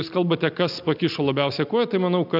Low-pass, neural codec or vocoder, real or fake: 5.4 kHz; none; real